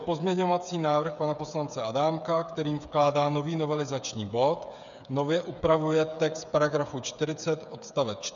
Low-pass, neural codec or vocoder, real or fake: 7.2 kHz; codec, 16 kHz, 8 kbps, FreqCodec, smaller model; fake